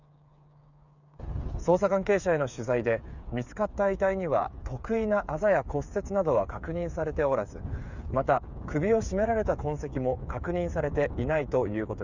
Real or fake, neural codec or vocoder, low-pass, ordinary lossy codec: fake; codec, 16 kHz, 8 kbps, FreqCodec, smaller model; 7.2 kHz; none